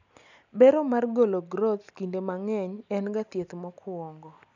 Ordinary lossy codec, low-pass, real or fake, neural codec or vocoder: none; 7.2 kHz; real; none